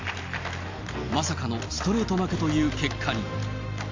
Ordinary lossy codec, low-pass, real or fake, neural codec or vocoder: MP3, 48 kbps; 7.2 kHz; real; none